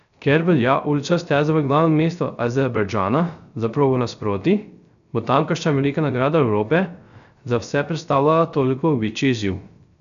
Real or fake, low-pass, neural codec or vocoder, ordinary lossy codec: fake; 7.2 kHz; codec, 16 kHz, 0.3 kbps, FocalCodec; none